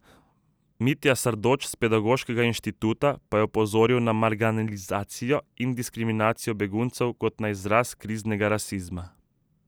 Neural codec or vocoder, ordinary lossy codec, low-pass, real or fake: none; none; none; real